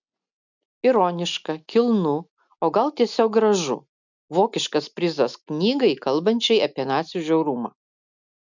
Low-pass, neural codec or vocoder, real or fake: 7.2 kHz; none; real